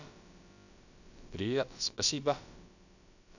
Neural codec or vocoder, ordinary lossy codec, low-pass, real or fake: codec, 16 kHz, about 1 kbps, DyCAST, with the encoder's durations; none; 7.2 kHz; fake